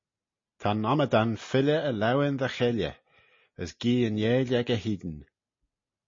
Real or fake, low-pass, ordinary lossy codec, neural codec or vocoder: real; 7.2 kHz; MP3, 32 kbps; none